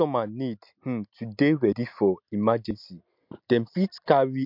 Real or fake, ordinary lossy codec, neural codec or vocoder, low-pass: real; MP3, 48 kbps; none; 5.4 kHz